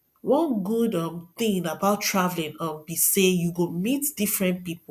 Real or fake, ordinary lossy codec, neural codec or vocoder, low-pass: real; none; none; 14.4 kHz